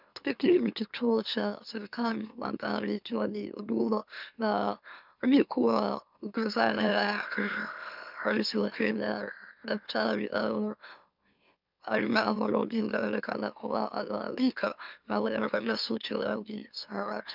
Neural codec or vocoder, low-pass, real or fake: autoencoder, 44.1 kHz, a latent of 192 numbers a frame, MeloTTS; 5.4 kHz; fake